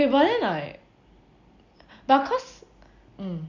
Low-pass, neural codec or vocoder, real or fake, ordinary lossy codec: 7.2 kHz; none; real; none